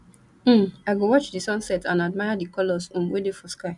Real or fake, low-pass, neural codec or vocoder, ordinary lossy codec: real; 10.8 kHz; none; none